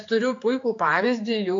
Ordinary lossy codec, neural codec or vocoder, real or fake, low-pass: AAC, 48 kbps; codec, 16 kHz, 4 kbps, X-Codec, HuBERT features, trained on general audio; fake; 7.2 kHz